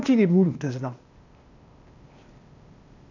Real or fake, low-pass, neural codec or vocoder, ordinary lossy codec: fake; 7.2 kHz; codec, 16 kHz, 0.8 kbps, ZipCodec; none